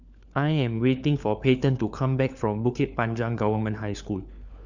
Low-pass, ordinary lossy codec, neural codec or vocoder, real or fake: 7.2 kHz; none; codec, 16 kHz, 4 kbps, FunCodec, trained on LibriTTS, 50 frames a second; fake